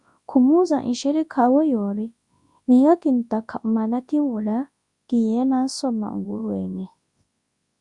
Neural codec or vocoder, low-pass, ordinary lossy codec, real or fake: codec, 24 kHz, 0.9 kbps, WavTokenizer, large speech release; 10.8 kHz; Opus, 64 kbps; fake